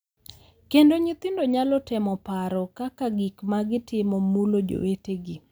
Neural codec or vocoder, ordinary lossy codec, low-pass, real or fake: none; none; none; real